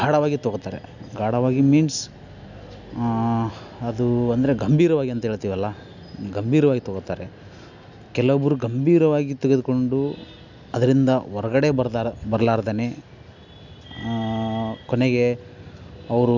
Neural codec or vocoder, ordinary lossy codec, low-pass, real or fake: none; none; 7.2 kHz; real